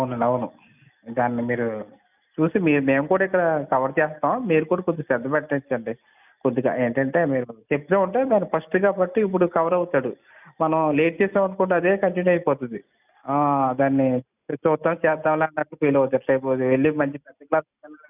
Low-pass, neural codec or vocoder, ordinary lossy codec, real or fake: 3.6 kHz; none; none; real